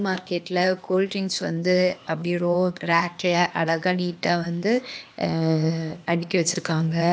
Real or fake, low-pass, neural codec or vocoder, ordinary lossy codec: fake; none; codec, 16 kHz, 0.8 kbps, ZipCodec; none